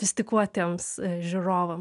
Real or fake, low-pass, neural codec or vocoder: real; 10.8 kHz; none